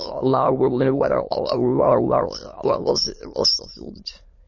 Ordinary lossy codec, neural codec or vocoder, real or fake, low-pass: MP3, 32 kbps; autoencoder, 22.05 kHz, a latent of 192 numbers a frame, VITS, trained on many speakers; fake; 7.2 kHz